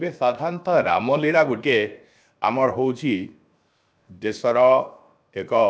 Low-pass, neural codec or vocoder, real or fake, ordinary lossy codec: none; codec, 16 kHz, 0.7 kbps, FocalCodec; fake; none